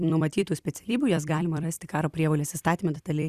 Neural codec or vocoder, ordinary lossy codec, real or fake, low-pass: vocoder, 44.1 kHz, 128 mel bands every 256 samples, BigVGAN v2; Opus, 64 kbps; fake; 14.4 kHz